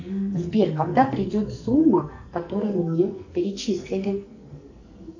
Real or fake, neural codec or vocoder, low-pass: fake; codec, 44.1 kHz, 2.6 kbps, SNAC; 7.2 kHz